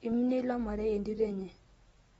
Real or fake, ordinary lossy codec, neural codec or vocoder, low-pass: real; AAC, 24 kbps; none; 19.8 kHz